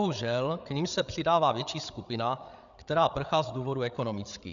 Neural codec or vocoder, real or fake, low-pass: codec, 16 kHz, 8 kbps, FreqCodec, larger model; fake; 7.2 kHz